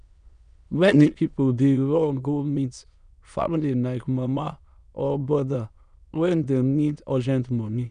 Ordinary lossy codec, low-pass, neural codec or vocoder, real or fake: none; 9.9 kHz; autoencoder, 22.05 kHz, a latent of 192 numbers a frame, VITS, trained on many speakers; fake